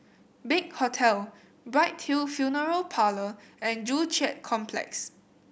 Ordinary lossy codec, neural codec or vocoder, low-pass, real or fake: none; none; none; real